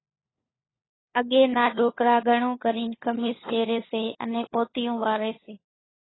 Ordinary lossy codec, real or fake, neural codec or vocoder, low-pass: AAC, 16 kbps; fake; codec, 16 kHz, 4 kbps, FunCodec, trained on LibriTTS, 50 frames a second; 7.2 kHz